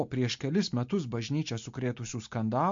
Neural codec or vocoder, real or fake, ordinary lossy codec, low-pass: none; real; MP3, 48 kbps; 7.2 kHz